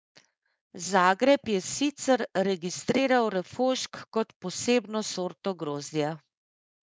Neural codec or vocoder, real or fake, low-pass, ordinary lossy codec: codec, 16 kHz, 4.8 kbps, FACodec; fake; none; none